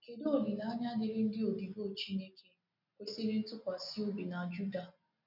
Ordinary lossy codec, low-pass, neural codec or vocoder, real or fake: none; 5.4 kHz; none; real